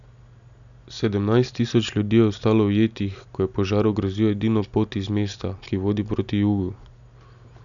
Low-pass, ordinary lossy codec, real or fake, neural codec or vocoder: 7.2 kHz; none; real; none